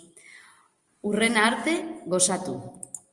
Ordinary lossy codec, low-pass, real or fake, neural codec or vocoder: Opus, 32 kbps; 10.8 kHz; real; none